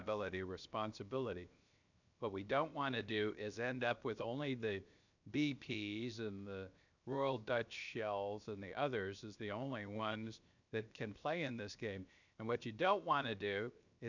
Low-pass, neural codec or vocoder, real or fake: 7.2 kHz; codec, 16 kHz, 0.7 kbps, FocalCodec; fake